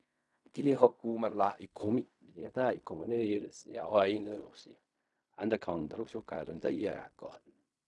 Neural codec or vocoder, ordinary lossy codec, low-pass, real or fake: codec, 16 kHz in and 24 kHz out, 0.4 kbps, LongCat-Audio-Codec, fine tuned four codebook decoder; none; 10.8 kHz; fake